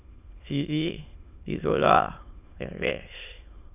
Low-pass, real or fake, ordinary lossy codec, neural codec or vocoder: 3.6 kHz; fake; none; autoencoder, 22.05 kHz, a latent of 192 numbers a frame, VITS, trained on many speakers